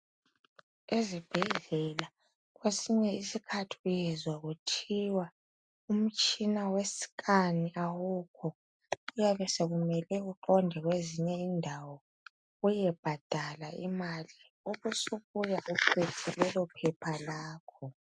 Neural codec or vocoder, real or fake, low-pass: none; real; 9.9 kHz